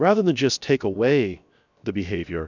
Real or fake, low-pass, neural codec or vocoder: fake; 7.2 kHz; codec, 16 kHz, 0.7 kbps, FocalCodec